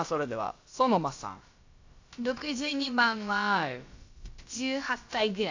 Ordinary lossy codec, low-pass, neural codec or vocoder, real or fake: AAC, 48 kbps; 7.2 kHz; codec, 16 kHz, about 1 kbps, DyCAST, with the encoder's durations; fake